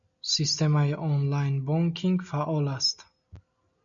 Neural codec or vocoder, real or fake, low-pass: none; real; 7.2 kHz